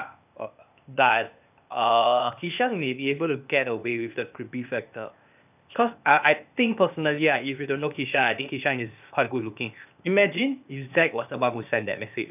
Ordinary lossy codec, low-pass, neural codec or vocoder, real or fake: none; 3.6 kHz; codec, 16 kHz, 0.8 kbps, ZipCodec; fake